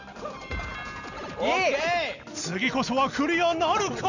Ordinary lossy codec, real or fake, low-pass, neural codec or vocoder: none; real; 7.2 kHz; none